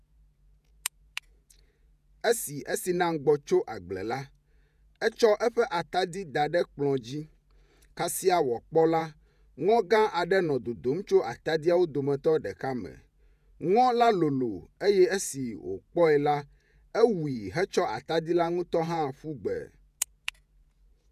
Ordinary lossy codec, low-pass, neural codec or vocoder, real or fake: none; 14.4 kHz; vocoder, 48 kHz, 128 mel bands, Vocos; fake